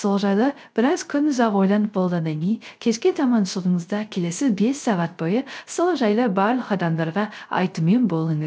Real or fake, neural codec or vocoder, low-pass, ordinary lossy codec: fake; codec, 16 kHz, 0.3 kbps, FocalCodec; none; none